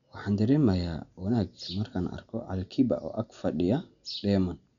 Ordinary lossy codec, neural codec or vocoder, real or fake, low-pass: none; none; real; 7.2 kHz